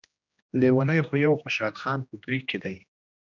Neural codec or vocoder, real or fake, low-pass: codec, 16 kHz, 1 kbps, X-Codec, HuBERT features, trained on general audio; fake; 7.2 kHz